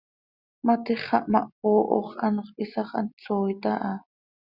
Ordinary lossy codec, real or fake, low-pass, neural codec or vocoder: Opus, 64 kbps; real; 5.4 kHz; none